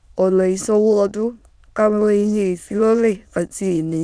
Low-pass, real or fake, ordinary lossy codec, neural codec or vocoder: none; fake; none; autoencoder, 22.05 kHz, a latent of 192 numbers a frame, VITS, trained on many speakers